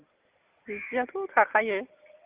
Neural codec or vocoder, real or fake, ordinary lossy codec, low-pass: none; real; Opus, 24 kbps; 3.6 kHz